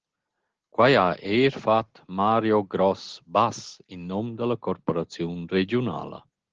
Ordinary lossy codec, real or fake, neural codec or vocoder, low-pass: Opus, 16 kbps; real; none; 7.2 kHz